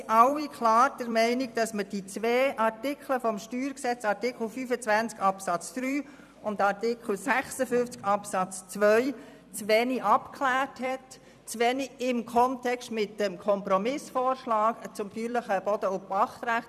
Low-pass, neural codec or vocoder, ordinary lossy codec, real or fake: 14.4 kHz; vocoder, 44.1 kHz, 128 mel bands every 256 samples, BigVGAN v2; none; fake